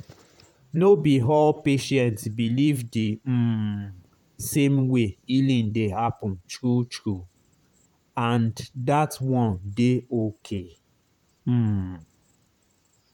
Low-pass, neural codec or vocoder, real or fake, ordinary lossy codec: 19.8 kHz; vocoder, 44.1 kHz, 128 mel bands, Pupu-Vocoder; fake; none